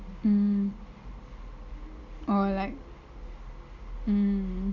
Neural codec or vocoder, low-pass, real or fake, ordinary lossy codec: none; 7.2 kHz; real; Opus, 64 kbps